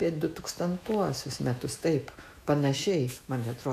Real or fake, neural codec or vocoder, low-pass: fake; autoencoder, 48 kHz, 128 numbers a frame, DAC-VAE, trained on Japanese speech; 14.4 kHz